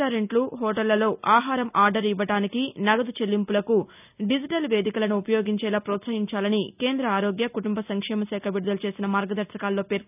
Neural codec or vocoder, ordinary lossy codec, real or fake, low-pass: none; none; real; 3.6 kHz